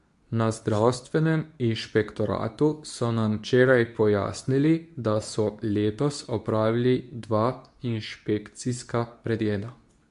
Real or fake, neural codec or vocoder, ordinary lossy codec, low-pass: fake; codec, 24 kHz, 0.9 kbps, WavTokenizer, medium speech release version 2; MP3, 64 kbps; 10.8 kHz